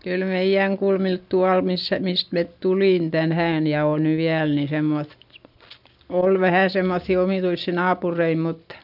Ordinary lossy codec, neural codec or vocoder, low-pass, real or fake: none; none; 5.4 kHz; real